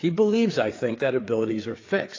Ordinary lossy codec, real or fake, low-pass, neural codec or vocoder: AAC, 32 kbps; fake; 7.2 kHz; codec, 16 kHz, 4 kbps, FreqCodec, larger model